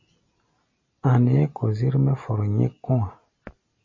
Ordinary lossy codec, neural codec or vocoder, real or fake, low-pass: MP3, 32 kbps; none; real; 7.2 kHz